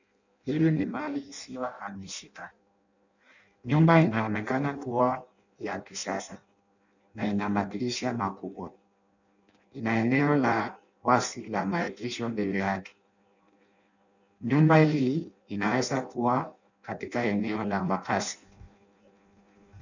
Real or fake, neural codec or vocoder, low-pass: fake; codec, 16 kHz in and 24 kHz out, 0.6 kbps, FireRedTTS-2 codec; 7.2 kHz